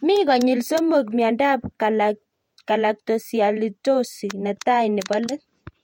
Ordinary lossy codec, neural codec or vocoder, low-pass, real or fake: MP3, 64 kbps; vocoder, 48 kHz, 128 mel bands, Vocos; 19.8 kHz; fake